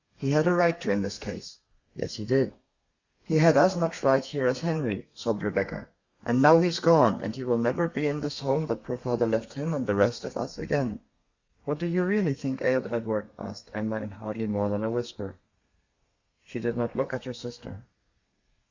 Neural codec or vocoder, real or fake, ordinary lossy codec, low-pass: codec, 32 kHz, 1.9 kbps, SNAC; fake; Opus, 64 kbps; 7.2 kHz